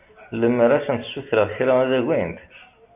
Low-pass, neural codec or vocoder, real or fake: 3.6 kHz; none; real